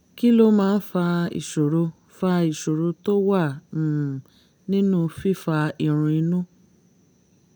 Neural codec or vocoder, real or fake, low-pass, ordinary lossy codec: none; real; none; none